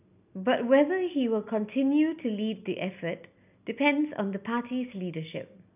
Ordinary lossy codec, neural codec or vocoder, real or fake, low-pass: none; none; real; 3.6 kHz